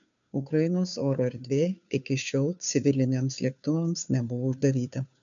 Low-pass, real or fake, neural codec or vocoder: 7.2 kHz; fake; codec, 16 kHz, 2 kbps, FunCodec, trained on Chinese and English, 25 frames a second